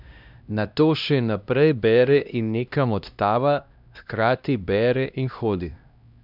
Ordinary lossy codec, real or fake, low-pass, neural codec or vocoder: none; fake; 5.4 kHz; codec, 16 kHz, 1 kbps, X-Codec, HuBERT features, trained on LibriSpeech